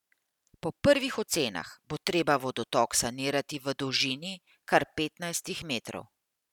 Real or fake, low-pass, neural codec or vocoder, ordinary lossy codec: real; 19.8 kHz; none; none